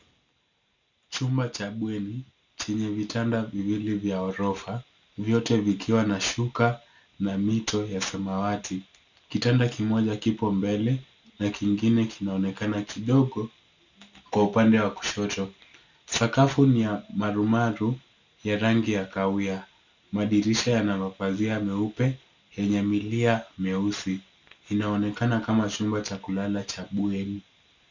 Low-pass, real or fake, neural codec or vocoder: 7.2 kHz; real; none